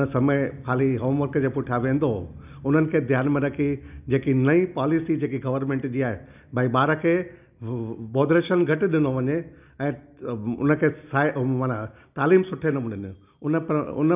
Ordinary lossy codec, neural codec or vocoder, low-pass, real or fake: none; none; 3.6 kHz; real